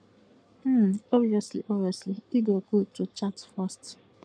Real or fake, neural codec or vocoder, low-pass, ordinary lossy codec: fake; codec, 44.1 kHz, 7.8 kbps, Pupu-Codec; 9.9 kHz; none